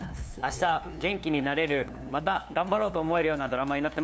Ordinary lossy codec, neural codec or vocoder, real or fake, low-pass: none; codec, 16 kHz, 2 kbps, FunCodec, trained on LibriTTS, 25 frames a second; fake; none